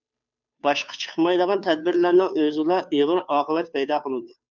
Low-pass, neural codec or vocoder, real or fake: 7.2 kHz; codec, 16 kHz, 2 kbps, FunCodec, trained on Chinese and English, 25 frames a second; fake